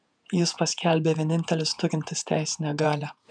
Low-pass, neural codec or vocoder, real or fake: 9.9 kHz; vocoder, 48 kHz, 128 mel bands, Vocos; fake